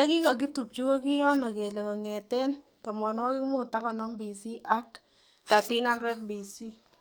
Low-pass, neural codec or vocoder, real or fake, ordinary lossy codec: none; codec, 44.1 kHz, 2.6 kbps, SNAC; fake; none